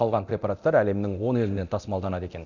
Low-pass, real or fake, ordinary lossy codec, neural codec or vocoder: 7.2 kHz; fake; none; codec, 24 kHz, 0.9 kbps, DualCodec